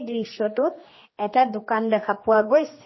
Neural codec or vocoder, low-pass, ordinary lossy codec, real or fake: codec, 16 kHz, 2 kbps, X-Codec, HuBERT features, trained on general audio; 7.2 kHz; MP3, 24 kbps; fake